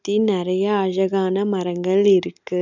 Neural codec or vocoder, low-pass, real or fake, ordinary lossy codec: none; 7.2 kHz; real; none